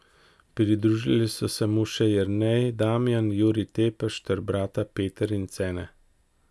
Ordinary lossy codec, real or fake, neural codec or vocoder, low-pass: none; real; none; none